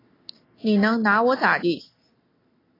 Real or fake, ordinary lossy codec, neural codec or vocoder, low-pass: real; AAC, 24 kbps; none; 5.4 kHz